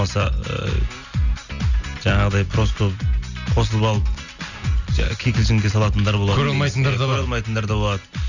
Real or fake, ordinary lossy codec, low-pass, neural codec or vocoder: real; none; 7.2 kHz; none